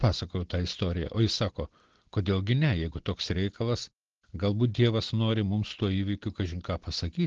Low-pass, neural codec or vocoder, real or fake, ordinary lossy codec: 7.2 kHz; none; real; Opus, 16 kbps